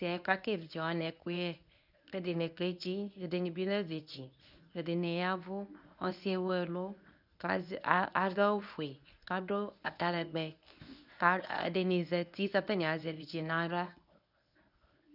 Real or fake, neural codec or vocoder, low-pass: fake; codec, 24 kHz, 0.9 kbps, WavTokenizer, medium speech release version 1; 5.4 kHz